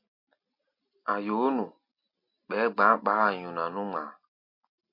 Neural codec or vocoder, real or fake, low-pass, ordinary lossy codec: none; real; 5.4 kHz; MP3, 32 kbps